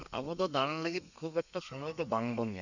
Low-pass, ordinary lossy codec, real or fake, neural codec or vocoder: 7.2 kHz; none; fake; codec, 32 kHz, 1.9 kbps, SNAC